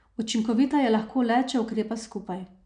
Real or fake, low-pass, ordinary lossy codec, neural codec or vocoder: real; 9.9 kHz; MP3, 96 kbps; none